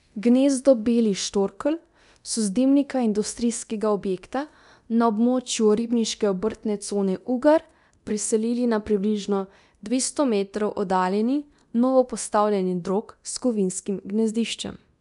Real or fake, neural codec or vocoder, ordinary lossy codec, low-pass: fake; codec, 24 kHz, 0.9 kbps, DualCodec; none; 10.8 kHz